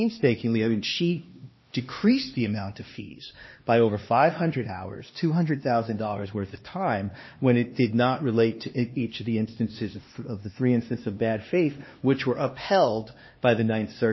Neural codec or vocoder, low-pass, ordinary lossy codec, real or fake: codec, 16 kHz, 2 kbps, X-Codec, HuBERT features, trained on LibriSpeech; 7.2 kHz; MP3, 24 kbps; fake